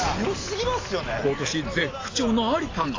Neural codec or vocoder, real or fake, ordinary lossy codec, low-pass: none; real; AAC, 32 kbps; 7.2 kHz